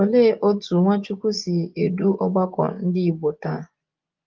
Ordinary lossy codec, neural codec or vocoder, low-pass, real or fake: Opus, 32 kbps; vocoder, 24 kHz, 100 mel bands, Vocos; 7.2 kHz; fake